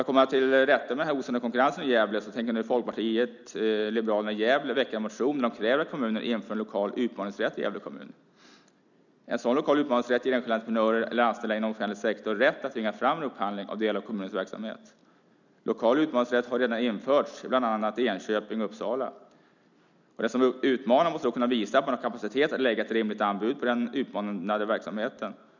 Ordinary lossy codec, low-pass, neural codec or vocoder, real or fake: none; 7.2 kHz; none; real